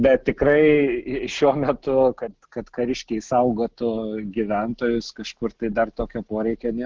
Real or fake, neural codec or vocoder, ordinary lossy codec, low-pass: real; none; Opus, 16 kbps; 7.2 kHz